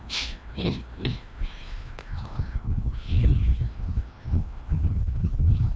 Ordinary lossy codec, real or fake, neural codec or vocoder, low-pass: none; fake; codec, 16 kHz, 1 kbps, FreqCodec, larger model; none